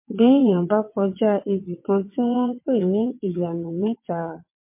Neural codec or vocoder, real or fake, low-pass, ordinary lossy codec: vocoder, 22.05 kHz, 80 mel bands, WaveNeXt; fake; 3.6 kHz; MP3, 24 kbps